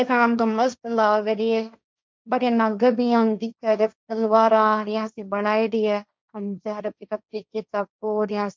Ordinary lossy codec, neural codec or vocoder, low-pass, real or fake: none; codec, 16 kHz, 1.1 kbps, Voila-Tokenizer; none; fake